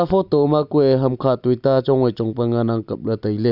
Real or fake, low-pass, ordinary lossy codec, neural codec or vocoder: real; 5.4 kHz; none; none